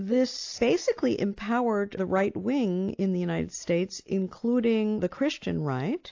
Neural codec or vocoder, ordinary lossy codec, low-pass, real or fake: none; AAC, 48 kbps; 7.2 kHz; real